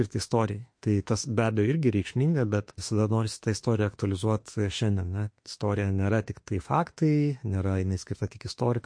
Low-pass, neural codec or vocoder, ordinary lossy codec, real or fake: 9.9 kHz; autoencoder, 48 kHz, 32 numbers a frame, DAC-VAE, trained on Japanese speech; MP3, 48 kbps; fake